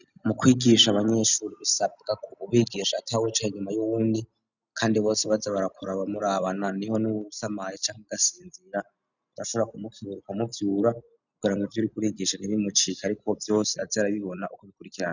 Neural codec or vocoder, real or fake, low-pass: none; real; 7.2 kHz